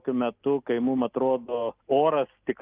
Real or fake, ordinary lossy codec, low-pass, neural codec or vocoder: real; Opus, 24 kbps; 3.6 kHz; none